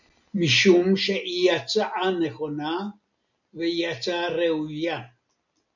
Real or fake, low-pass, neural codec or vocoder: real; 7.2 kHz; none